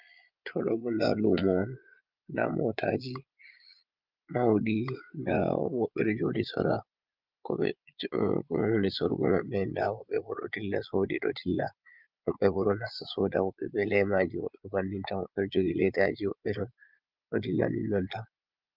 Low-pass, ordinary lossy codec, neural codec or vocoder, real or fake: 5.4 kHz; Opus, 24 kbps; vocoder, 22.05 kHz, 80 mel bands, Vocos; fake